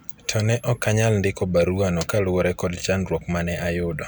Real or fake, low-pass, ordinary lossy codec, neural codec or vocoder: real; none; none; none